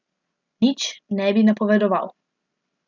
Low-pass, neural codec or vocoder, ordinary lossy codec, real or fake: 7.2 kHz; none; none; real